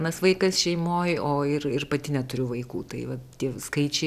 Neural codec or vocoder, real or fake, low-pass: none; real; 14.4 kHz